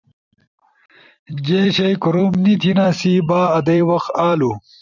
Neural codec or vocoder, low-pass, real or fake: vocoder, 44.1 kHz, 128 mel bands every 256 samples, BigVGAN v2; 7.2 kHz; fake